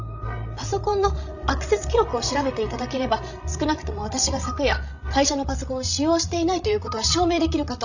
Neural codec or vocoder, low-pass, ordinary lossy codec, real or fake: codec, 16 kHz, 16 kbps, FreqCodec, larger model; 7.2 kHz; none; fake